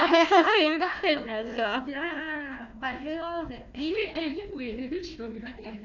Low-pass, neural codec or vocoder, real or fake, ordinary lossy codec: 7.2 kHz; codec, 16 kHz, 1 kbps, FunCodec, trained on Chinese and English, 50 frames a second; fake; none